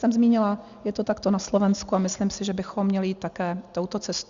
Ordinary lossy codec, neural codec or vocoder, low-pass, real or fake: Opus, 64 kbps; none; 7.2 kHz; real